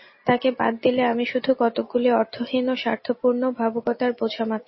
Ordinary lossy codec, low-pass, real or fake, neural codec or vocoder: MP3, 24 kbps; 7.2 kHz; real; none